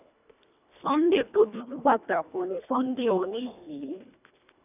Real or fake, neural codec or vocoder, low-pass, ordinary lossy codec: fake; codec, 24 kHz, 1.5 kbps, HILCodec; 3.6 kHz; none